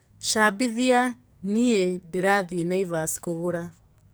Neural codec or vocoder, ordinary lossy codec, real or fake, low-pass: codec, 44.1 kHz, 2.6 kbps, SNAC; none; fake; none